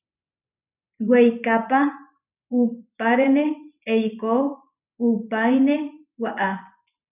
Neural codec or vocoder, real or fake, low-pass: vocoder, 24 kHz, 100 mel bands, Vocos; fake; 3.6 kHz